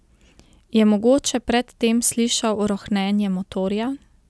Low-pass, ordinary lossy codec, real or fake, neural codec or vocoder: none; none; real; none